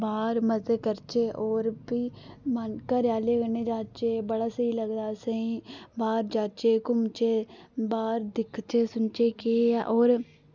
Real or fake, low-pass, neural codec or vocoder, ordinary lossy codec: real; 7.2 kHz; none; none